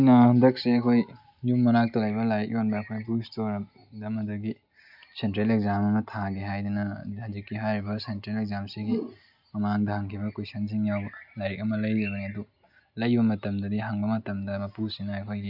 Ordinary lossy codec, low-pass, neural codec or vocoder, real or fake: none; 5.4 kHz; none; real